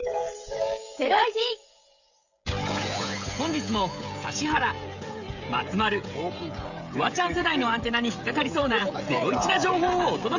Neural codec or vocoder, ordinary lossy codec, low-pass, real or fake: codec, 16 kHz, 16 kbps, FreqCodec, smaller model; none; 7.2 kHz; fake